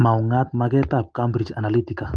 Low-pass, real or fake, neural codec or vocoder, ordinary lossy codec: 9.9 kHz; real; none; Opus, 24 kbps